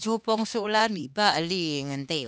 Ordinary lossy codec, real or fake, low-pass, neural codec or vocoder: none; fake; none; codec, 16 kHz, 2 kbps, X-Codec, HuBERT features, trained on LibriSpeech